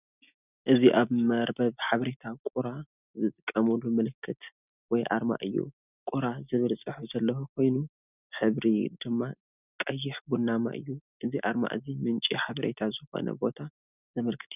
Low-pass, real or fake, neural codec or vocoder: 3.6 kHz; real; none